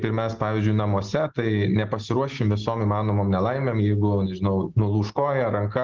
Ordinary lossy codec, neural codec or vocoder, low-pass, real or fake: Opus, 16 kbps; none; 7.2 kHz; real